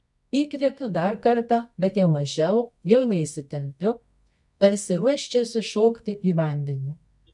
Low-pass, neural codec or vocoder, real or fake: 10.8 kHz; codec, 24 kHz, 0.9 kbps, WavTokenizer, medium music audio release; fake